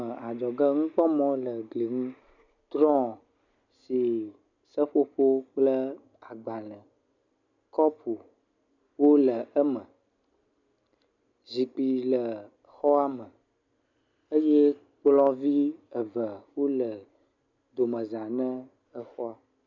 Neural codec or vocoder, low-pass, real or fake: none; 7.2 kHz; real